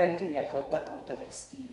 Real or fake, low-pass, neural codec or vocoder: fake; 10.8 kHz; codec, 24 kHz, 1 kbps, SNAC